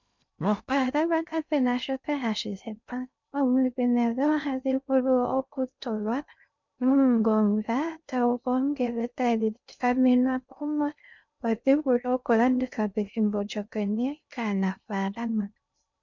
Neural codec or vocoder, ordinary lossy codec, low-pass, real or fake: codec, 16 kHz in and 24 kHz out, 0.6 kbps, FocalCodec, streaming, 2048 codes; MP3, 64 kbps; 7.2 kHz; fake